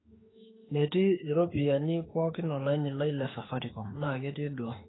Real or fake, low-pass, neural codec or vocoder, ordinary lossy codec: fake; 7.2 kHz; autoencoder, 48 kHz, 32 numbers a frame, DAC-VAE, trained on Japanese speech; AAC, 16 kbps